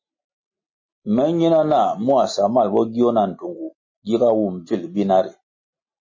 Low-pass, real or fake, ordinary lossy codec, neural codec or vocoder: 7.2 kHz; real; MP3, 32 kbps; none